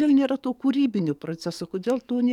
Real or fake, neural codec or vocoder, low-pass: fake; codec, 44.1 kHz, 7.8 kbps, DAC; 19.8 kHz